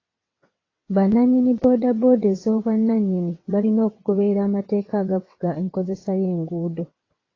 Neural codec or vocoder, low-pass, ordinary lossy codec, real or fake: vocoder, 22.05 kHz, 80 mel bands, WaveNeXt; 7.2 kHz; AAC, 32 kbps; fake